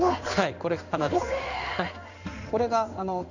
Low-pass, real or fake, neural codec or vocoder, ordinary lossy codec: 7.2 kHz; fake; codec, 16 kHz in and 24 kHz out, 1 kbps, XY-Tokenizer; none